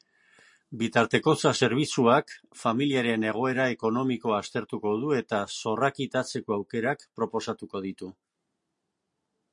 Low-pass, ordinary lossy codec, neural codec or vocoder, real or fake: 10.8 kHz; MP3, 48 kbps; none; real